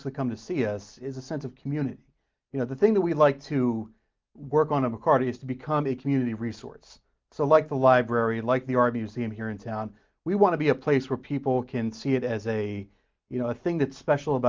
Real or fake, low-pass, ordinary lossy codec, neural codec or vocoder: real; 7.2 kHz; Opus, 24 kbps; none